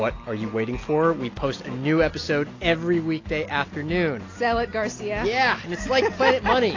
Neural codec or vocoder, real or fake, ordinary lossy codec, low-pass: none; real; AAC, 32 kbps; 7.2 kHz